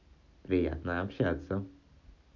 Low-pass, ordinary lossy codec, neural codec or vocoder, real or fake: 7.2 kHz; none; none; real